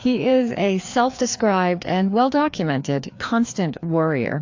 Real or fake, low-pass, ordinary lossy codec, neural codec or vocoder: fake; 7.2 kHz; AAC, 48 kbps; codec, 16 kHz, 2 kbps, FreqCodec, larger model